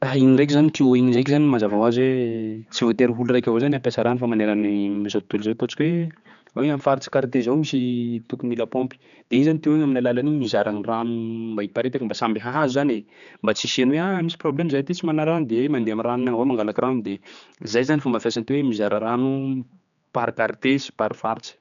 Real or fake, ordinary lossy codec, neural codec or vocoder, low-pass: fake; none; codec, 16 kHz, 4 kbps, X-Codec, HuBERT features, trained on general audio; 7.2 kHz